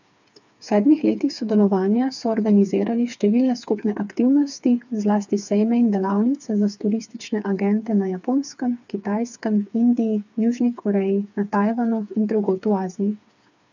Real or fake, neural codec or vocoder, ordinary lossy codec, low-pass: fake; codec, 16 kHz, 4 kbps, FreqCodec, smaller model; none; 7.2 kHz